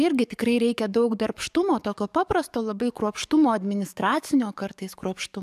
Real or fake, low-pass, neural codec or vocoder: fake; 14.4 kHz; codec, 44.1 kHz, 7.8 kbps, DAC